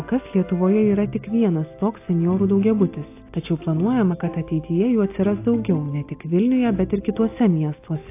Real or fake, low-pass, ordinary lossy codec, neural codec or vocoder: real; 3.6 kHz; AAC, 24 kbps; none